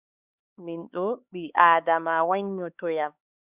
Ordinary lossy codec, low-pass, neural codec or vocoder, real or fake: Opus, 64 kbps; 3.6 kHz; codec, 16 kHz, 4 kbps, X-Codec, HuBERT features, trained on LibriSpeech; fake